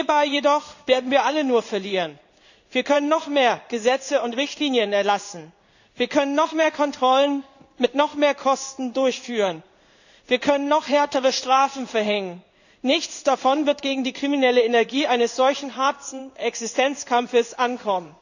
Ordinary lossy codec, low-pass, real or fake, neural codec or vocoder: none; 7.2 kHz; fake; codec, 16 kHz in and 24 kHz out, 1 kbps, XY-Tokenizer